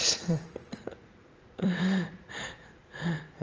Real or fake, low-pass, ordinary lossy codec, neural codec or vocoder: fake; 7.2 kHz; Opus, 24 kbps; vocoder, 44.1 kHz, 80 mel bands, Vocos